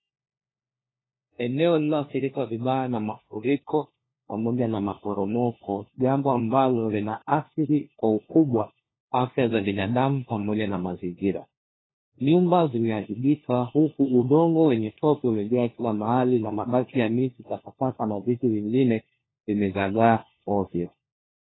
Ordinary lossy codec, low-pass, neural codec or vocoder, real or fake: AAC, 16 kbps; 7.2 kHz; codec, 16 kHz, 1 kbps, FunCodec, trained on LibriTTS, 50 frames a second; fake